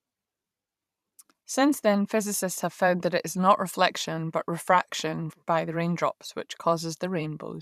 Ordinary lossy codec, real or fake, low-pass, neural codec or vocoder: none; fake; 14.4 kHz; vocoder, 44.1 kHz, 128 mel bands every 512 samples, BigVGAN v2